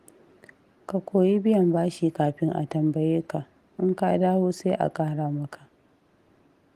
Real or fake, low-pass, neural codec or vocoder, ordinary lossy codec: real; 14.4 kHz; none; Opus, 24 kbps